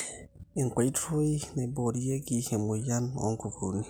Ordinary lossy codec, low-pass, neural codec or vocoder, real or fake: none; none; none; real